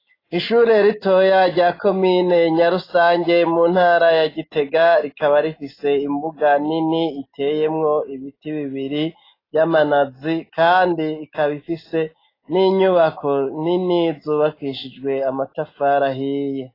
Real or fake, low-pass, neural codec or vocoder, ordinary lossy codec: real; 5.4 kHz; none; AAC, 24 kbps